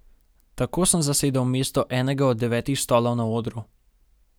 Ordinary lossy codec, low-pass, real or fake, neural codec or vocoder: none; none; real; none